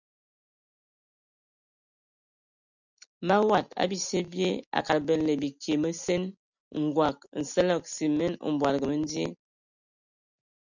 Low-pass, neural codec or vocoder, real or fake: 7.2 kHz; none; real